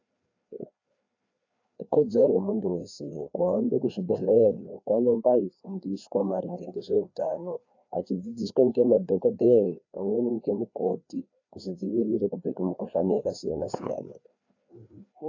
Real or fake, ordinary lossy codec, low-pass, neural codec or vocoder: fake; AAC, 48 kbps; 7.2 kHz; codec, 16 kHz, 2 kbps, FreqCodec, larger model